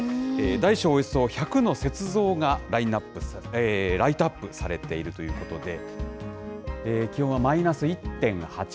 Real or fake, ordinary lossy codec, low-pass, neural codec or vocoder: real; none; none; none